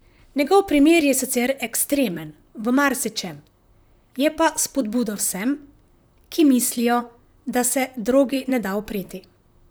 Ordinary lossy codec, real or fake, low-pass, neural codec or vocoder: none; fake; none; vocoder, 44.1 kHz, 128 mel bands, Pupu-Vocoder